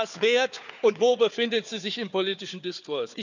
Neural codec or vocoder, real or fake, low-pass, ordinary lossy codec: codec, 16 kHz, 4 kbps, FunCodec, trained on Chinese and English, 50 frames a second; fake; 7.2 kHz; none